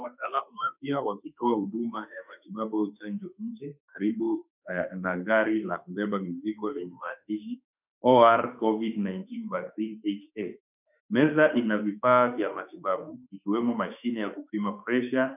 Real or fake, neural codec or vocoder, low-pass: fake; autoencoder, 48 kHz, 32 numbers a frame, DAC-VAE, trained on Japanese speech; 3.6 kHz